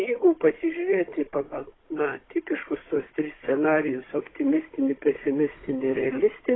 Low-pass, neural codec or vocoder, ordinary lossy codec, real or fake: 7.2 kHz; codec, 16 kHz, 16 kbps, FunCodec, trained on Chinese and English, 50 frames a second; AAC, 16 kbps; fake